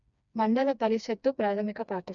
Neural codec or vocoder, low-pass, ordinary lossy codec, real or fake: codec, 16 kHz, 2 kbps, FreqCodec, smaller model; 7.2 kHz; none; fake